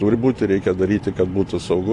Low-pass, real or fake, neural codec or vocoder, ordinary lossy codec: 10.8 kHz; real; none; AAC, 48 kbps